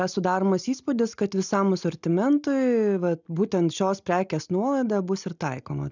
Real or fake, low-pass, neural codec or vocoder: real; 7.2 kHz; none